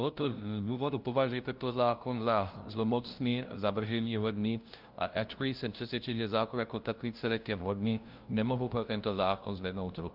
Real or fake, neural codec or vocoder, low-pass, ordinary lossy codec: fake; codec, 16 kHz, 0.5 kbps, FunCodec, trained on LibriTTS, 25 frames a second; 5.4 kHz; Opus, 32 kbps